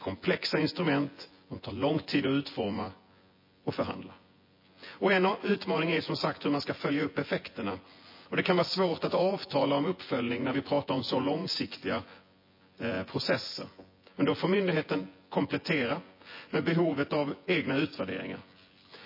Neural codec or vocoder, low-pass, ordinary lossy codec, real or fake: vocoder, 24 kHz, 100 mel bands, Vocos; 5.4 kHz; MP3, 24 kbps; fake